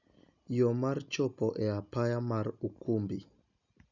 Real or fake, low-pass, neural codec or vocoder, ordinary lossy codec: real; 7.2 kHz; none; none